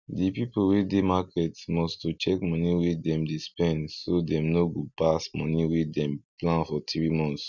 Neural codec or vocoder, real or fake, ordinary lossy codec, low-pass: none; real; none; 7.2 kHz